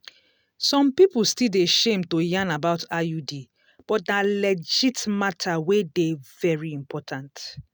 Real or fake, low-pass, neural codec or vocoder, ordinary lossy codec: real; none; none; none